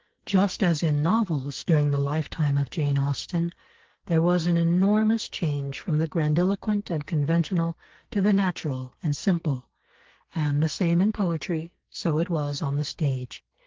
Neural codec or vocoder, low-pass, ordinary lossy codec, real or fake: codec, 44.1 kHz, 2.6 kbps, SNAC; 7.2 kHz; Opus, 16 kbps; fake